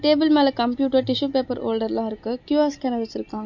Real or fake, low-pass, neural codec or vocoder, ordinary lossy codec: real; 7.2 kHz; none; MP3, 48 kbps